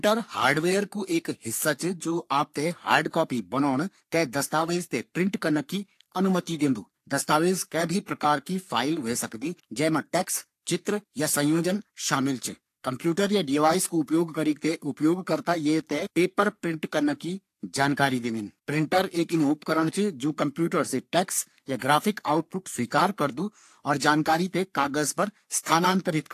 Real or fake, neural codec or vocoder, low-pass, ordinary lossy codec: fake; codec, 44.1 kHz, 3.4 kbps, Pupu-Codec; 14.4 kHz; AAC, 64 kbps